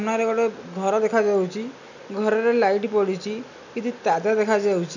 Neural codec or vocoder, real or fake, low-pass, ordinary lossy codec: none; real; 7.2 kHz; none